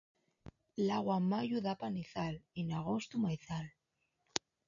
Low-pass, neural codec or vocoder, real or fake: 7.2 kHz; none; real